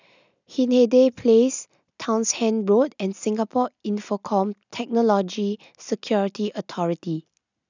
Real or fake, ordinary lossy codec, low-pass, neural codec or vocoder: real; none; 7.2 kHz; none